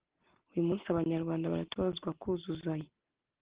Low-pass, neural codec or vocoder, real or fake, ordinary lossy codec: 3.6 kHz; none; real; Opus, 16 kbps